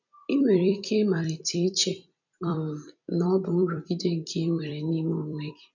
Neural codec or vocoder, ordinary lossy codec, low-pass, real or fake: vocoder, 44.1 kHz, 128 mel bands every 256 samples, BigVGAN v2; none; 7.2 kHz; fake